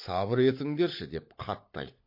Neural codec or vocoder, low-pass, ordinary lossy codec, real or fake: codec, 16 kHz, 16 kbps, FunCodec, trained on LibriTTS, 50 frames a second; 5.4 kHz; MP3, 32 kbps; fake